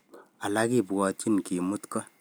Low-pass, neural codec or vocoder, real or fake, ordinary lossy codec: none; none; real; none